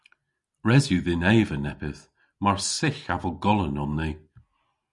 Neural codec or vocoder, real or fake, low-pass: none; real; 10.8 kHz